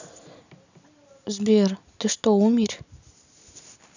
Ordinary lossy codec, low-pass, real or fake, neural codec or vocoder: none; 7.2 kHz; real; none